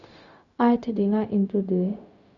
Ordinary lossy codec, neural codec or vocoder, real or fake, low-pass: none; codec, 16 kHz, 0.4 kbps, LongCat-Audio-Codec; fake; 7.2 kHz